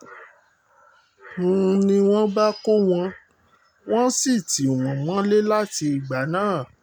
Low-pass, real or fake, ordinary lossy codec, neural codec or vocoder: none; real; none; none